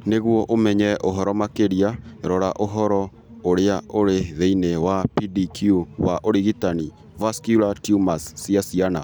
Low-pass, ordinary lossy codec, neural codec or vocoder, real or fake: none; none; none; real